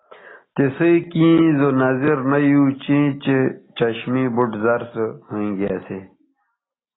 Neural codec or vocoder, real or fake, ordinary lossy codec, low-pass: none; real; AAC, 16 kbps; 7.2 kHz